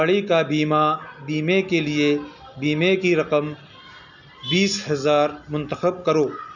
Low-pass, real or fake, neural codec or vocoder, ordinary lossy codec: 7.2 kHz; real; none; none